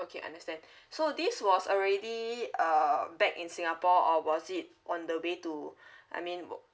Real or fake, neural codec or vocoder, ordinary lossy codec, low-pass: real; none; none; none